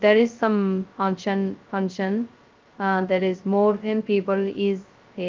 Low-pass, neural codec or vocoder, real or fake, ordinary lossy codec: 7.2 kHz; codec, 16 kHz, 0.2 kbps, FocalCodec; fake; Opus, 32 kbps